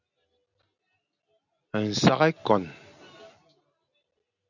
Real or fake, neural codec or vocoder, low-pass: real; none; 7.2 kHz